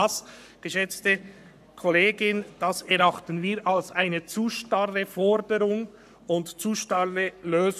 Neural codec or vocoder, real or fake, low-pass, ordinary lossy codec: codec, 44.1 kHz, 7.8 kbps, Pupu-Codec; fake; 14.4 kHz; none